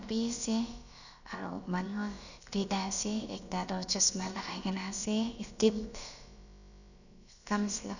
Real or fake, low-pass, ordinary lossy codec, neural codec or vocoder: fake; 7.2 kHz; none; codec, 16 kHz, about 1 kbps, DyCAST, with the encoder's durations